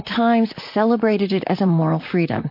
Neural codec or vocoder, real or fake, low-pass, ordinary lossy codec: vocoder, 44.1 kHz, 128 mel bands, Pupu-Vocoder; fake; 5.4 kHz; MP3, 32 kbps